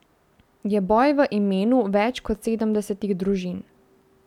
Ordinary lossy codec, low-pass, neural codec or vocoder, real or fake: none; 19.8 kHz; none; real